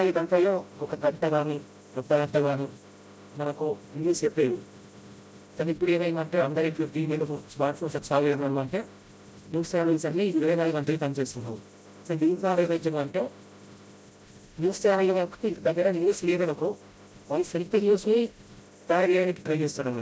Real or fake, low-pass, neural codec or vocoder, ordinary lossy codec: fake; none; codec, 16 kHz, 0.5 kbps, FreqCodec, smaller model; none